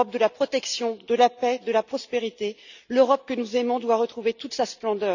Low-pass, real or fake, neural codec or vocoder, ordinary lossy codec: 7.2 kHz; real; none; none